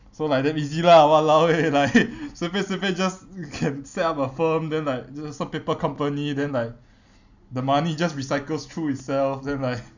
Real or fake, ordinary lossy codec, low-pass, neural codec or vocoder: real; none; 7.2 kHz; none